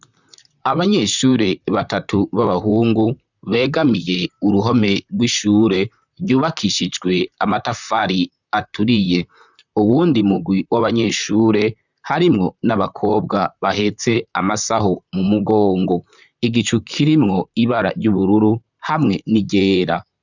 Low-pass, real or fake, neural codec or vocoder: 7.2 kHz; fake; vocoder, 44.1 kHz, 128 mel bands, Pupu-Vocoder